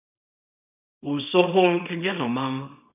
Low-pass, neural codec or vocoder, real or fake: 3.6 kHz; codec, 24 kHz, 0.9 kbps, WavTokenizer, small release; fake